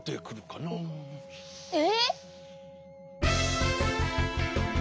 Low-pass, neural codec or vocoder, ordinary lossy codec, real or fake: none; none; none; real